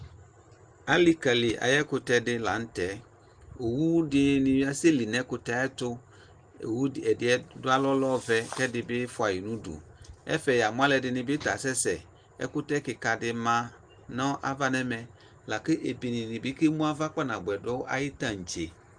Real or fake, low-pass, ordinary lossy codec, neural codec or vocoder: real; 9.9 kHz; Opus, 24 kbps; none